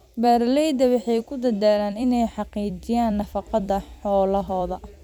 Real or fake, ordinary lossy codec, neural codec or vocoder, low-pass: fake; none; vocoder, 44.1 kHz, 128 mel bands every 256 samples, BigVGAN v2; 19.8 kHz